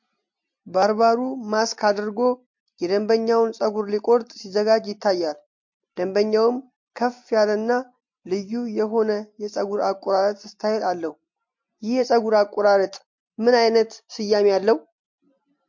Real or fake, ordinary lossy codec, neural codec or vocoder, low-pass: real; MP3, 48 kbps; none; 7.2 kHz